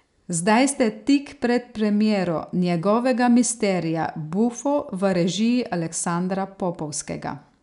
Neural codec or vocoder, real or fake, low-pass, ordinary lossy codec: none; real; 10.8 kHz; none